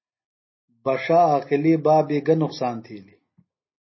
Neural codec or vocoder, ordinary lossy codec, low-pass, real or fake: none; MP3, 24 kbps; 7.2 kHz; real